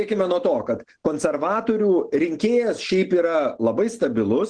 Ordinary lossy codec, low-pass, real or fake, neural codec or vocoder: Opus, 16 kbps; 9.9 kHz; real; none